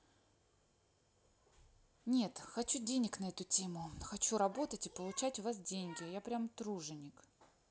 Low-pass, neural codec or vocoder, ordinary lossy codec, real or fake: none; none; none; real